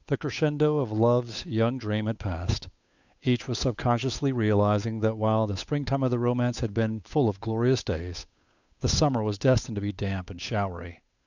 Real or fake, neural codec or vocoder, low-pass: fake; codec, 16 kHz, 8 kbps, FunCodec, trained on Chinese and English, 25 frames a second; 7.2 kHz